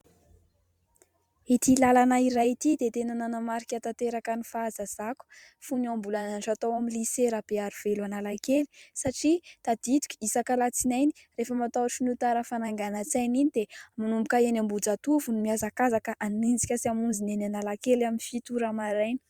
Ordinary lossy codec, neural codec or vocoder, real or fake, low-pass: Opus, 64 kbps; vocoder, 44.1 kHz, 128 mel bands every 512 samples, BigVGAN v2; fake; 19.8 kHz